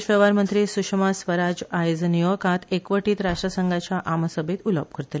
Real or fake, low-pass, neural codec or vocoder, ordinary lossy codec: real; none; none; none